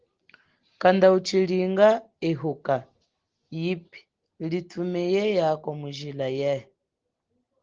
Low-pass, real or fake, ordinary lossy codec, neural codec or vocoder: 7.2 kHz; real; Opus, 16 kbps; none